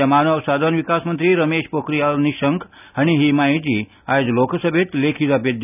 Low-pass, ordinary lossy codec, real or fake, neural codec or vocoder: 3.6 kHz; none; real; none